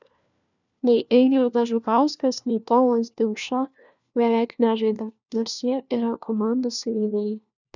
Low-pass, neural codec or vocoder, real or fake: 7.2 kHz; codec, 16 kHz, 1 kbps, FunCodec, trained on LibriTTS, 50 frames a second; fake